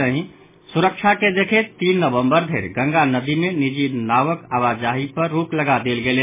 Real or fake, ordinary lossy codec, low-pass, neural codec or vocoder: real; MP3, 16 kbps; 3.6 kHz; none